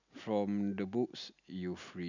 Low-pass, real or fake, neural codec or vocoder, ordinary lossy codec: 7.2 kHz; real; none; none